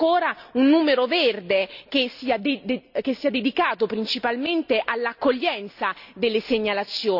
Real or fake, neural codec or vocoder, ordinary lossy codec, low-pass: real; none; none; 5.4 kHz